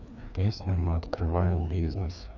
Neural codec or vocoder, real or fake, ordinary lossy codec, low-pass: codec, 16 kHz, 2 kbps, FreqCodec, larger model; fake; none; 7.2 kHz